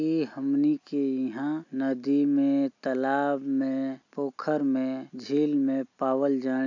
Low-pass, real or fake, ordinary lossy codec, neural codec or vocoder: 7.2 kHz; real; AAC, 48 kbps; none